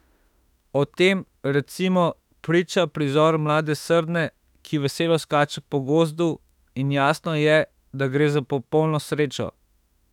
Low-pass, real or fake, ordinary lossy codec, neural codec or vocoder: 19.8 kHz; fake; none; autoencoder, 48 kHz, 32 numbers a frame, DAC-VAE, trained on Japanese speech